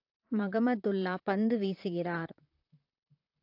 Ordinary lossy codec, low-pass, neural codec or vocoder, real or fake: AAC, 32 kbps; 5.4 kHz; vocoder, 44.1 kHz, 128 mel bands, Pupu-Vocoder; fake